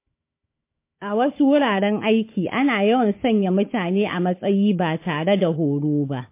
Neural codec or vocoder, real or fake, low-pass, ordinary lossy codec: codec, 16 kHz, 4 kbps, FunCodec, trained on Chinese and English, 50 frames a second; fake; 3.6 kHz; MP3, 24 kbps